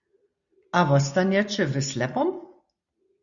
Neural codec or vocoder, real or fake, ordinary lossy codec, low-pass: none; real; Opus, 64 kbps; 7.2 kHz